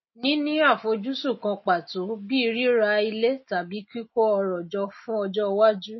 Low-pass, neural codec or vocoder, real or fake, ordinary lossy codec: 7.2 kHz; none; real; MP3, 24 kbps